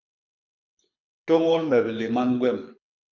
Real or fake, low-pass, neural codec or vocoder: fake; 7.2 kHz; codec, 24 kHz, 6 kbps, HILCodec